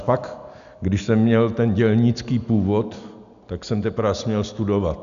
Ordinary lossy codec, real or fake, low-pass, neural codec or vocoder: AAC, 96 kbps; real; 7.2 kHz; none